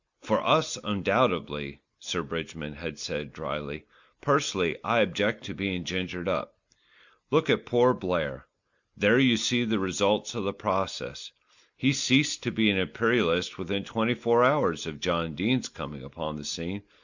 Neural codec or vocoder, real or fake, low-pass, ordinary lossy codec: none; real; 7.2 kHz; Opus, 64 kbps